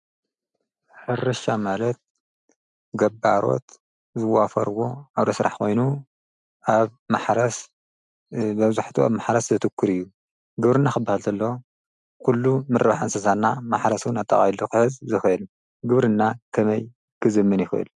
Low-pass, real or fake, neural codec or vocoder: 10.8 kHz; real; none